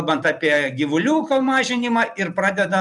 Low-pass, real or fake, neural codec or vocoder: 10.8 kHz; fake; vocoder, 44.1 kHz, 128 mel bands every 256 samples, BigVGAN v2